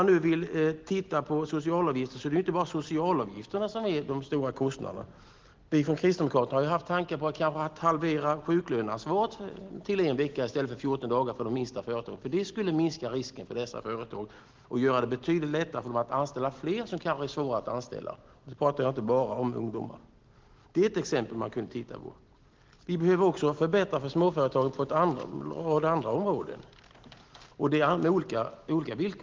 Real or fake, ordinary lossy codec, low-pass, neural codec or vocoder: real; Opus, 16 kbps; 7.2 kHz; none